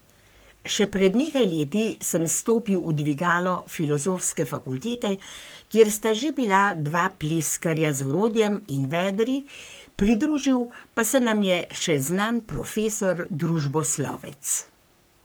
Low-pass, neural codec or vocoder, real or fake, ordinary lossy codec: none; codec, 44.1 kHz, 3.4 kbps, Pupu-Codec; fake; none